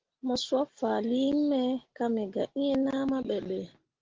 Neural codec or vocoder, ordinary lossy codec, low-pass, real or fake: none; Opus, 16 kbps; 7.2 kHz; real